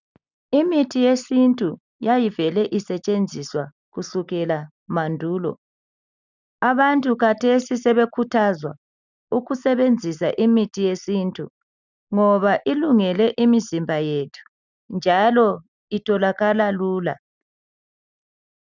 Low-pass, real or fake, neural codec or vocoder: 7.2 kHz; real; none